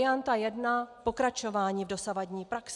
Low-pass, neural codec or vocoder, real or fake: 10.8 kHz; none; real